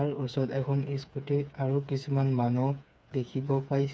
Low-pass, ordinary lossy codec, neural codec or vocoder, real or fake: none; none; codec, 16 kHz, 4 kbps, FreqCodec, smaller model; fake